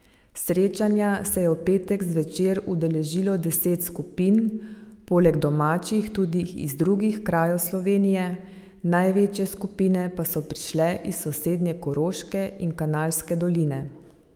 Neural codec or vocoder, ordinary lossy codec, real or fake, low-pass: autoencoder, 48 kHz, 128 numbers a frame, DAC-VAE, trained on Japanese speech; Opus, 32 kbps; fake; 19.8 kHz